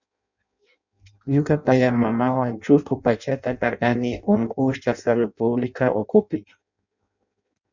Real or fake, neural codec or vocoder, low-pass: fake; codec, 16 kHz in and 24 kHz out, 0.6 kbps, FireRedTTS-2 codec; 7.2 kHz